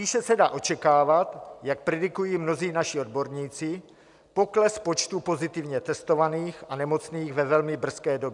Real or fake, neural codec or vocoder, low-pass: real; none; 10.8 kHz